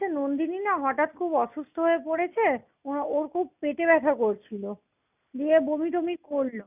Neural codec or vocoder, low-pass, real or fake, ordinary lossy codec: none; 3.6 kHz; real; none